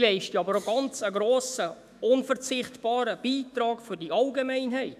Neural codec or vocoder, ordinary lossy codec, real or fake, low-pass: autoencoder, 48 kHz, 128 numbers a frame, DAC-VAE, trained on Japanese speech; none; fake; 14.4 kHz